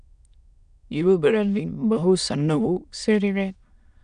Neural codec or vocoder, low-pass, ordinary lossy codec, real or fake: autoencoder, 22.05 kHz, a latent of 192 numbers a frame, VITS, trained on many speakers; 9.9 kHz; none; fake